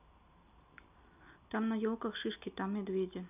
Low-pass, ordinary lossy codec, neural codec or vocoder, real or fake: 3.6 kHz; none; none; real